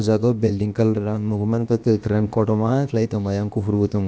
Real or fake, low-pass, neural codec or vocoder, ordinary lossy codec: fake; none; codec, 16 kHz, about 1 kbps, DyCAST, with the encoder's durations; none